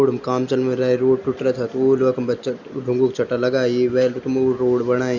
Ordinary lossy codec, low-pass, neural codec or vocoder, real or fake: none; 7.2 kHz; none; real